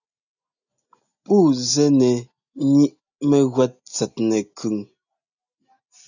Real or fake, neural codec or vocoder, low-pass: real; none; 7.2 kHz